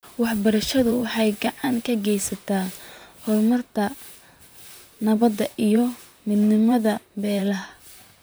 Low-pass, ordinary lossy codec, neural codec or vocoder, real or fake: none; none; vocoder, 44.1 kHz, 128 mel bands, Pupu-Vocoder; fake